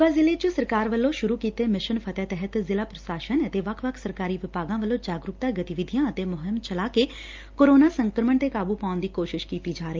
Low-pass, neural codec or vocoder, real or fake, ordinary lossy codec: 7.2 kHz; none; real; Opus, 24 kbps